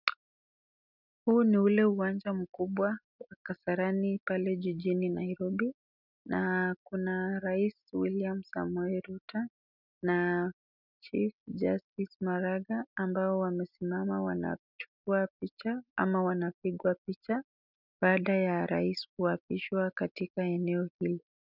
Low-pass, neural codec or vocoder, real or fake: 5.4 kHz; none; real